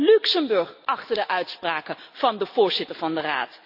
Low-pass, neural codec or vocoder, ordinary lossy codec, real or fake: 5.4 kHz; none; none; real